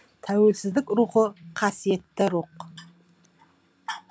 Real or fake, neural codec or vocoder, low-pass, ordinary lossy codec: real; none; none; none